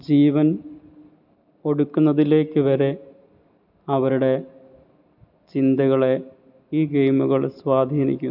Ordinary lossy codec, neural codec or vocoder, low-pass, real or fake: none; none; 5.4 kHz; real